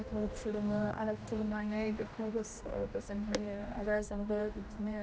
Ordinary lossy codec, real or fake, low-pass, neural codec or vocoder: none; fake; none; codec, 16 kHz, 1 kbps, X-Codec, HuBERT features, trained on general audio